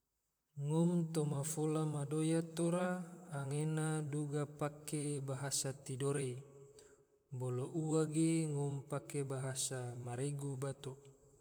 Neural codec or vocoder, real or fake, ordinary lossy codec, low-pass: vocoder, 44.1 kHz, 128 mel bands, Pupu-Vocoder; fake; none; none